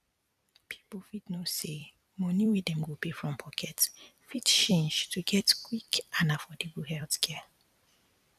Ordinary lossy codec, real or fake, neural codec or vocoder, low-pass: none; fake; vocoder, 44.1 kHz, 128 mel bands every 256 samples, BigVGAN v2; 14.4 kHz